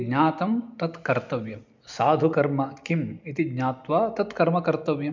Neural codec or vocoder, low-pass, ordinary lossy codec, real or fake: none; 7.2 kHz; MP3, 64 kbps; real